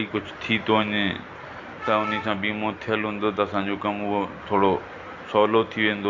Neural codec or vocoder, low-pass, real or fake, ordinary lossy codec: none; 7.2 kHz; real; none